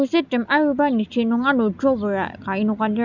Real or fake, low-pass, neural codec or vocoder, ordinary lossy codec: real; 7.2 kHz; none; none